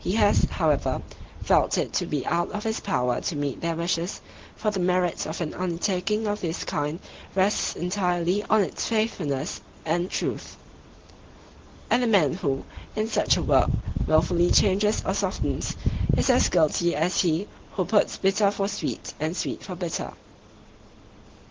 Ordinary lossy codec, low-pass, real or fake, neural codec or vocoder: Opus, 16 kbps; 7.2 kHz; real; none